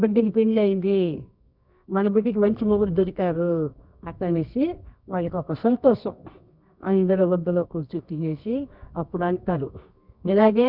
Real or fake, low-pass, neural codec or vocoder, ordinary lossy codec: fake; 5.4 kHz; codec, 24 kHz, 0.9 kbps, WavTokenizer, medium music audio release; none